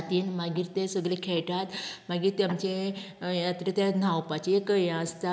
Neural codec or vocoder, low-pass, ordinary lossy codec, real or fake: none; none; none; real